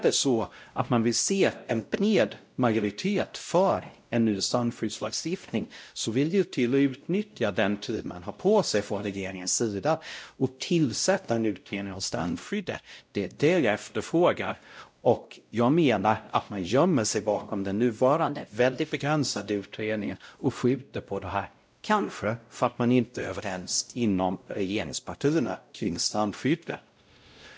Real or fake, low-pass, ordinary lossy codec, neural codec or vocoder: fake; none; none; codec, 16 kHz, 0.5 kbps, X-Codec, WavLM features, trained on Multilingual LibriSpeech